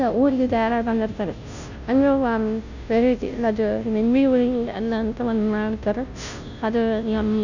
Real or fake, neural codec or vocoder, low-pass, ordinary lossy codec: fake; codec, 16 kHz, 0.5 kbps, FunCodec, trained on Chinese and English, 25 frames a second; 7.2 kHz; none